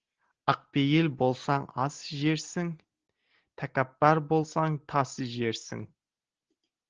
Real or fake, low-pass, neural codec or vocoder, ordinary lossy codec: real; 7.2 kHz; none; Opus, 16 kbps